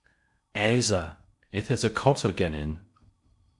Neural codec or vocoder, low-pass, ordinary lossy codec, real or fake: codec, 16 kHz in and 24 kHz out, 0.6 kbps, FocalCodec, streaming, 4096 codes; 10.8 kHz; MP3, 64 kbps; fake